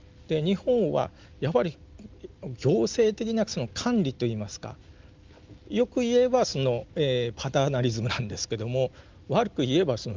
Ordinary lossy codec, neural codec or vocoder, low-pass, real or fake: Opus, 32 kbps; none; 7.2 kHz; real